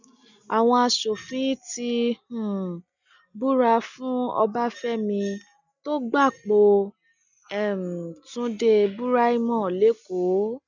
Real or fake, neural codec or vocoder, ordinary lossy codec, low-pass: real; none; none; 7.2 kHz